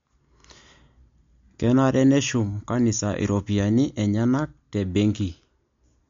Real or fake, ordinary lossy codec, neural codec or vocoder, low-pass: real; MP3, 48 kbps; none; 7.2 kHz